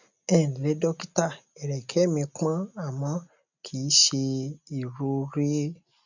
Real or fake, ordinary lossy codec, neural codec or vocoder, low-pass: real; none; none; 7.2 kHz